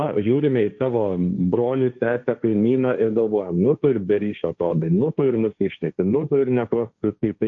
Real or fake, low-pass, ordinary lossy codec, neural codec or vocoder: fake; 7.2 kHz; AAC, 64 kbps; codec, 16 kHz, 1.1 kbps, Voila-Tokenizer